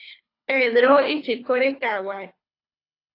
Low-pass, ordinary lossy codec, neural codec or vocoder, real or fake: 5.4 kHz; AAC, 32 kbps; codec, 24 kHz, 3 kbps, HILCodec; fake